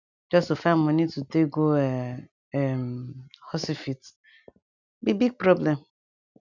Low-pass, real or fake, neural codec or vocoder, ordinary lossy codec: 7.2 kHz; real; none; none